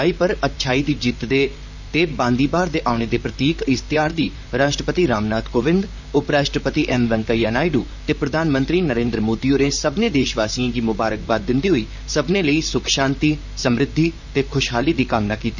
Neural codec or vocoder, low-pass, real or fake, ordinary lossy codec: vocoder, 44.1 kHz, 128 mel bands, Pupu-Vocoder; 7.2 kHz; fake; none